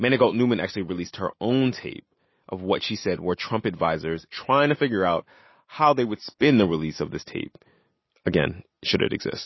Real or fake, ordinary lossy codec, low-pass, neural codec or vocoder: real; MP3, 24 kbps; 7.2 kHz; none